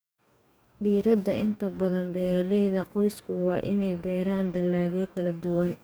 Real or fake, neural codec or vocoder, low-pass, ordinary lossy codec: fake; codec, 44.1 kHz, 2.6 kbps, DAC; none; none